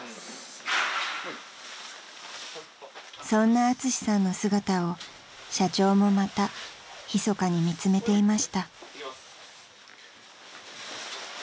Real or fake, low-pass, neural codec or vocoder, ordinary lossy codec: real; none; none; none